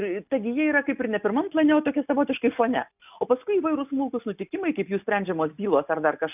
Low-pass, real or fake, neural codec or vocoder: 3.6 kHz; real; none